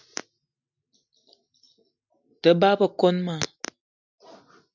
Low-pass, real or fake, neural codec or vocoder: 7.2 kHz; real; none